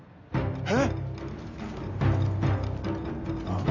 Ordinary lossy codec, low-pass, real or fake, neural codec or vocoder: none; 7.2 kHz; real; none